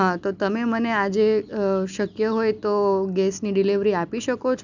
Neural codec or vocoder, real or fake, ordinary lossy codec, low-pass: none; real; none; 7.2 kHz